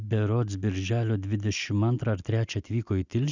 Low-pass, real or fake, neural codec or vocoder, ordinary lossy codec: 7.2 kHz; real; none; Opus, 64 kbps